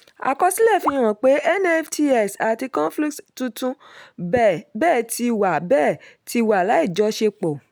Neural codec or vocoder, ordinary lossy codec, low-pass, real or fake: none; none; none; real